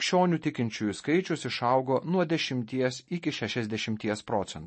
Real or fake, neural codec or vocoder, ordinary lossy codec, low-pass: real; none; MP3, 32 kbps; 9.9 kHz